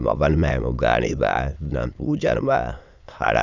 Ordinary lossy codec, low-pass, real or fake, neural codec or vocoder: none; 7.2 kHz; fake; autoencoder, 22.05 kHz, a latent of 192 numbers a frame, VITS, trained on many speakers